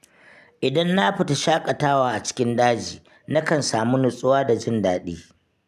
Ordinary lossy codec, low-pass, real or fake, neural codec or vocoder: none; 14.4 kHz; real; none